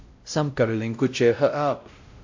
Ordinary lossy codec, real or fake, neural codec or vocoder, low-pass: AAC, 48 kbps; fake; codec, 16 kHz, 0.5 kbps, X-Codec, WavLM features, trained on Multilingual LibriSpeech; 7.2 kHz